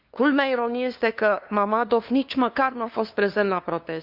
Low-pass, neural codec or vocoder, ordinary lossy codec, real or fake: 5.4 kHz; codec, 16 kHz, 2 kbps, FunCodec, trained on LibriTTS, 25 frames a second; Opus, 64 kbps; fake